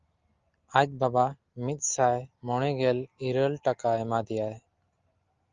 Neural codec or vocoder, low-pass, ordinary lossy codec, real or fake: none; 7.2 kHz; Opus, 32 kbps; real